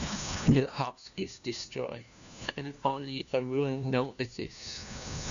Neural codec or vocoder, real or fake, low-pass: codec, 16 kHz, 1 kbps, FunCodec, trained on LibriTTS, 50 frames a second; fake; 7.2 kHz